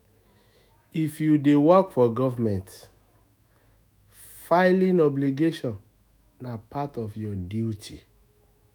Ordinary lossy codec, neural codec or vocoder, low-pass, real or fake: none; autoencoder, 48 kHz, 128 numbers a frame, DAC-VAE, trained on Japanese speech; none; fake